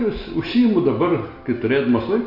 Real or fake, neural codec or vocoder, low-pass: real; none; 5.4 kHz